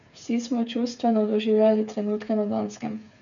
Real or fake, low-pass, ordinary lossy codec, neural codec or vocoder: fake; 7.2 kHz; none; codec, 16 kHz, 8 kbps, FreqCodec, smaller model